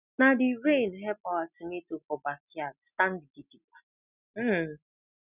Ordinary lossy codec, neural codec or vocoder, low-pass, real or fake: none; none; 3.6 kHz; real